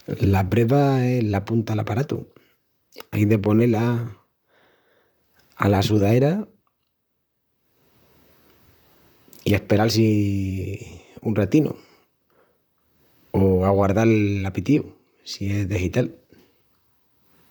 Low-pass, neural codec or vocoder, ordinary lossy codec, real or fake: none; vocoder, 44.1 kHz, 128 mel bands, Pupu-Vocoder; none; fake